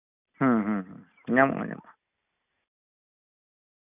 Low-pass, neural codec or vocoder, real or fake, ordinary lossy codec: 3.6 kHz; none; real; none